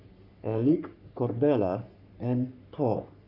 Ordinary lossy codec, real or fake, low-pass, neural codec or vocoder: none; fake; 5.4 kHz; codec, 44.1 kHz, 3.4 kbps, Pupu-Codec